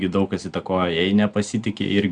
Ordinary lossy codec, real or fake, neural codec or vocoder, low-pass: Opus, 64 kbps; real; none; 9.9 kHz